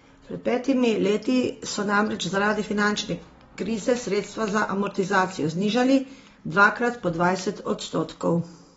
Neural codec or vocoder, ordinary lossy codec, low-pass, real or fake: none; AAC, 24 kbps; 19.8 kHz; real